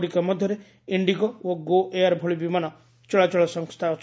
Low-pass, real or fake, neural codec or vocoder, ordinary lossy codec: none; real; none; none